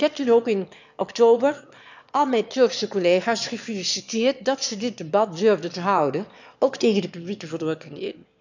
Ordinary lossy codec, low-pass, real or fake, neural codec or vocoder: none; 7.2 kHz; fake; autoencoder, 22.05 kHz, a latent of 192 numbers a frame, VITS, trained on one speaker